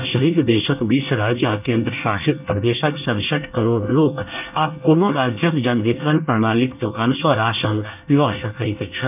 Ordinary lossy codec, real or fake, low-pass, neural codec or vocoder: none; fake; 3.6 kHz; codec, 24 kHz, 1 kbps, SNAC